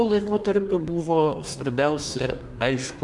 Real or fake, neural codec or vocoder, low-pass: fake; codec, 24 kHz, 1 kbps, SNAC; 10.8 kHz